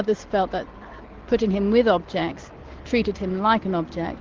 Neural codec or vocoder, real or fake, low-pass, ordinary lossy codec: none; real; 7.2 kHz; Opus, 16 kbps